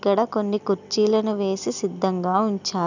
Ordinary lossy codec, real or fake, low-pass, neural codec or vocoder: none; real; 7.2 kHz; none